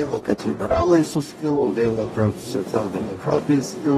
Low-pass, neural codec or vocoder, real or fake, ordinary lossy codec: 19.8 kHz; codec, 44.1 kHz, 0.9 kbps, DAC; fake; AAC, 32 kbps